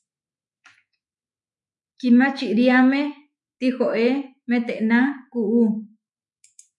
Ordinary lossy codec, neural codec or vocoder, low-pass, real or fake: MP3, 64 kbps; autoencoder, 48 kHz, 128 numbers a frame, DAC-VAE, trained on Japanese speech; 10.8 kHz; fake